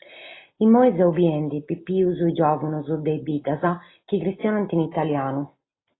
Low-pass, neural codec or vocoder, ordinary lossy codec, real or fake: 7.2 kHz; none; AAC, 16 kbps; real